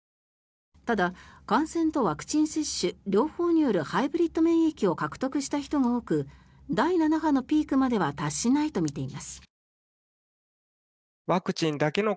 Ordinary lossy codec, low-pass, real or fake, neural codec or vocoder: none; none; real; none